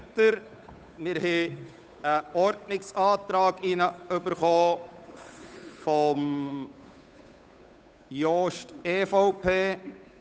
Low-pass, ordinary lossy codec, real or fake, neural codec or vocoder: none; none; fake; codec, 16 kHz, 8 kbps, FunCodec, trained on Chinese and English, 25 frames a second